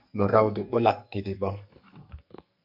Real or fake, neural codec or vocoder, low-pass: fake; codec, 44.1 kHz, 2.6 kbps, SNAC; 5.4 kHz